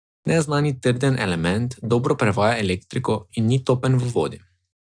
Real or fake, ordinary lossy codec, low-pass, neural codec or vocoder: real; none; 9.9 kHz; none